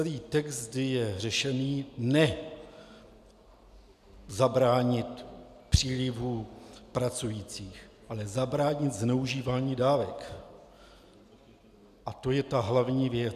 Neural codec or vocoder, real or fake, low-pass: none; real; 14.4 kHz